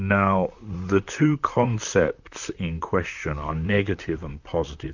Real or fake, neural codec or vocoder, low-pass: fake; vocoder, 44.1 kHz, 128 mel bands, Pupu-Vocoder; 7.2 kHz